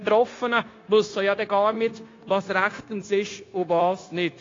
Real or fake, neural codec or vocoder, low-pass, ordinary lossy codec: fake; codec, 16 kHz, 0.9 kbps, LongCat-Audio-Codec; 7.2 kHz; AAC, 32 kbps